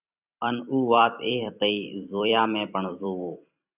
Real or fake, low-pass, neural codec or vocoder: fake; 3.6 kHz; vocoder, 44.1 kHz, 128 mel bands every 256 samples, BigVGAN v2